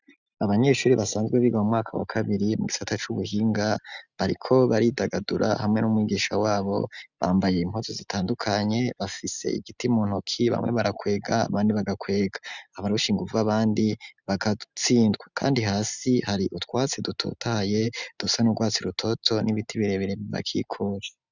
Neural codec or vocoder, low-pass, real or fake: none; 7.2 kHz; real